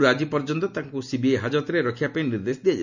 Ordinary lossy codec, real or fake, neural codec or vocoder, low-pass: none; real; none; 7.2 kHz